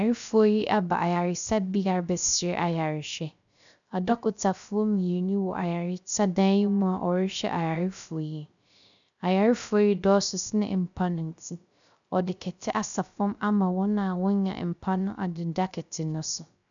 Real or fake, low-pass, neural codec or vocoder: fake; 7.2 kHz; codec, 16 kHz, 0.3 kbps, FocalCodec